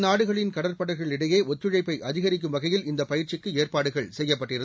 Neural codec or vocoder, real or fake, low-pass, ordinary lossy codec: none; real; none; none